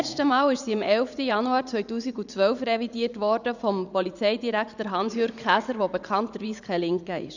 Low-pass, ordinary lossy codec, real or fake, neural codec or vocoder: 7.2 kHz; none; fake; vocoder, 44.1 kHz, 80 mel bands, Vocos